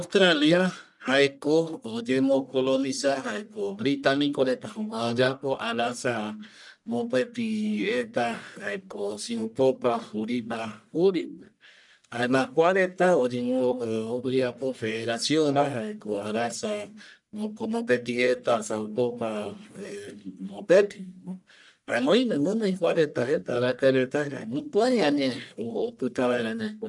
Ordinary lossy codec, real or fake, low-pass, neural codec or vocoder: none; fake; 10.8 kHz; codec, 44.1 kHz, 1.7 kbps, Pupu-Codec